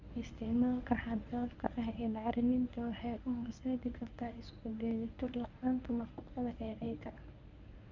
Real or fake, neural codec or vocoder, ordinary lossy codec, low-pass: fake; codec, 24 kHz, 0.9 kbps, WavTokenizer, medium speech release version 1; none; 7.2 kHz